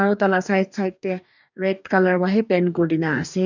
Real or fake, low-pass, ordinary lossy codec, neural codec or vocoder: fake; 7.2 kHz; none; codec, 44.1 kHz, 2.6 kbps, DAC